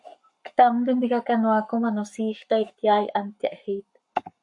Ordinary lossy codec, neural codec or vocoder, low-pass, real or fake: MP3, 64 kbps; codec, 44.1 kHz, 7.8 kbps, Pupu-Codec; 10.8 kHz; fake